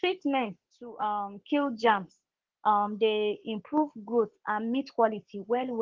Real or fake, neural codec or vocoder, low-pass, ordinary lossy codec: fake; codec, 44.1 kHz, 7.8 kbps, Pupu-Codec; 7.2 kHz; Opus, 16 kbps